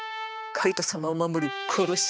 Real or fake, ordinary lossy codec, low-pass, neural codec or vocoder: fake; none; none; codec, 16 kHz, 2 kbps, X-Codec, HuBERT features, trained on balanced general audio